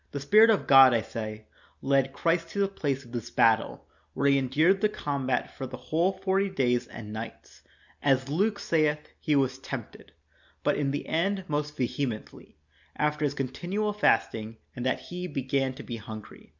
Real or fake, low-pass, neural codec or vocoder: real; 7.2 kHz; none